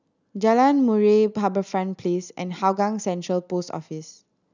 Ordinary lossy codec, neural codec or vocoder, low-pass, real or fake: none; none; 7.2 kHz; real